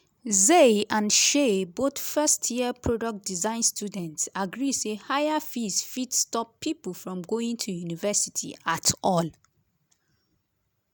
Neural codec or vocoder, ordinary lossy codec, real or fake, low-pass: none; none; real; none